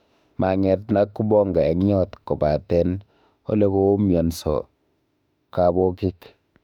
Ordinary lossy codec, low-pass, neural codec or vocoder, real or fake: none; 19.8 kHz; autoencoder, 48 kHz, 32 numbers a frame, DAC-VAE, trained on Japanese speech; fake